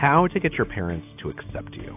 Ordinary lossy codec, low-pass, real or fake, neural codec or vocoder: AAC, 32 kbps; 3.6 kHz; real; none